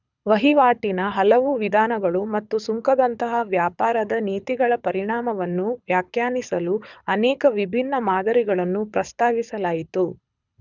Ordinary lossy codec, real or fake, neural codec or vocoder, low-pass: none; fake; codec, 24 kHz, 6 kbps, HILCodec; 7.2 kHz